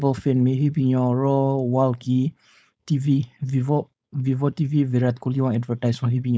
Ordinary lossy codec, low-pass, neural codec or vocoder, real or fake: none; none; codec, 16 kHz, 4.8 kbps, FACodec; fake